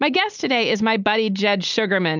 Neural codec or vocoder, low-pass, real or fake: none; 7.2 kHz; real